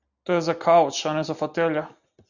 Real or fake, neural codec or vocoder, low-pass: real; none; 7.2 kHz